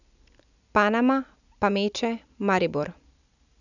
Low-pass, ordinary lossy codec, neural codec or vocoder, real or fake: 7.2 kHz; none; none; real